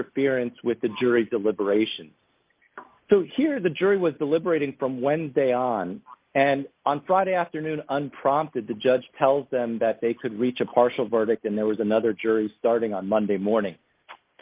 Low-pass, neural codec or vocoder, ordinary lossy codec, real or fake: 3.6 kHz; none; Opus, 24 kbps; real